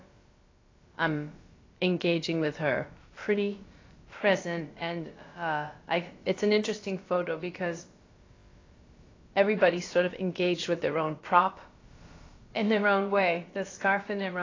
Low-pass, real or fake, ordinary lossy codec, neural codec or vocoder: 7.2 kHz; fake; AAC, 32 kbps; codec, 16 kHz, about 1 kbps, DyCAST, with the encoder's durations